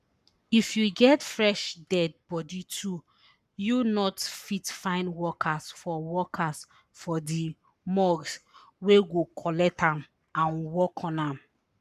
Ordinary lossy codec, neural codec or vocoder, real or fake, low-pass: none; codec, 44.1 kHz, 7.8 kbps, Pupu-Codec; fake; 14.4 kHz